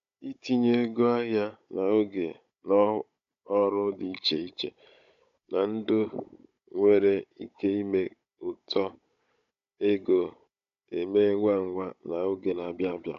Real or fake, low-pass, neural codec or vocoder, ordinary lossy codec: fake; 7.2 kHz; codec, 16 kHz, 16 kbps, FunCodec, trained on Chinese and English, 50 frames a second; MP3, 64 kbps